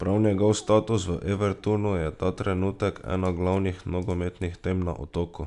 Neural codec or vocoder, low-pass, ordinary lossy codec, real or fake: none; 10.8 kHz; none; real